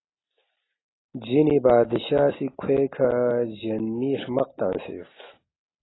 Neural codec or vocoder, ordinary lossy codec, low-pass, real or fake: none; AAC, 16 kbps; 7.2 kHz; real